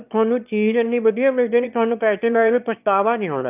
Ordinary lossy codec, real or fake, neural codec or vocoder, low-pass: none; fake; autoencoder, 22.05 kHz, a latent of 192 numbers a frame, VITS, trained on one speaker; 3.6 kHz